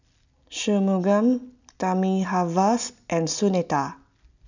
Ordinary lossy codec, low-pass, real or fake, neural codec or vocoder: none; 7.2 kHz; real; none